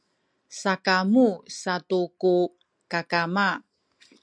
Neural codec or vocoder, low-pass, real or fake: none; 9.9 kHz; real